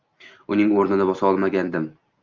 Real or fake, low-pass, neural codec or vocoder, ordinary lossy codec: real; 7.2 kHz; none; Opus, 24 kbps